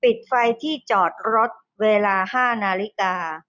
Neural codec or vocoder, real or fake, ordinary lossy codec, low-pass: none; real; none; 7.2 kHz